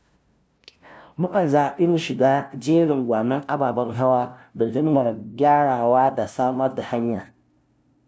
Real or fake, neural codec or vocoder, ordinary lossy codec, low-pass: fake; codec, 16 kHz, 0.5 kbps, FunCodec, trained on LibriTTS, 25 frames a second; none; none